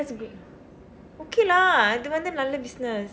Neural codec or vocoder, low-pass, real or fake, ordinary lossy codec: none; none; real; none